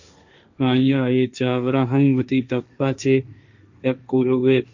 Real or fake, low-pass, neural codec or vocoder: fake; 7.2 kHz; codec, 16 kHz, 1.1 kbps, Voila-Tokenizer